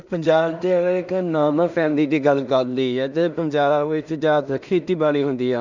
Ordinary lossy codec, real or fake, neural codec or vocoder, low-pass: none; fake; codec, 16 kHz in and 24 kHz out, 0.4 kbps, LongCat-Audio-Codec, two codebook decoder; 7.2 kHz